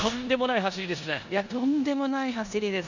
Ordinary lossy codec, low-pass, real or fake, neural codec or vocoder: none; 7.2 kHz; fake; codec, 16 kHz in and 24 kHz out, 0.9 kbps, LongCat-Audio-Codec, four codebook decoder